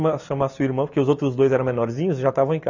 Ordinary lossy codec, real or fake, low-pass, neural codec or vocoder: none; real; 7.2 kHz; none